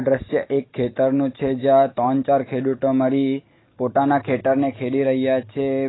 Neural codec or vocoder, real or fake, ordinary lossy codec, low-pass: none; real; AAC, 16 kbps; 7.2 kHz